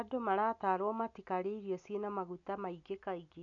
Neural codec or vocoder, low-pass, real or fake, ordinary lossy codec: none; 7.2 kHz; real; none